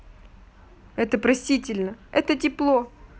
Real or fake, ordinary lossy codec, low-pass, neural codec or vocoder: real; none; none; none